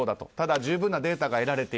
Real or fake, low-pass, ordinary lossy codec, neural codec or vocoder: real; none; none; none